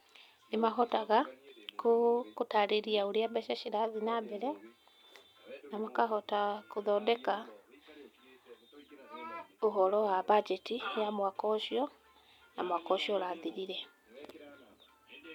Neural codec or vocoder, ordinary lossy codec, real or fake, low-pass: none; none; real; 19.8 kHz